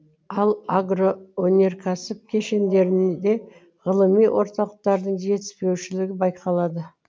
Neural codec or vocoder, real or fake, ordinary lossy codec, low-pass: none; real; none; none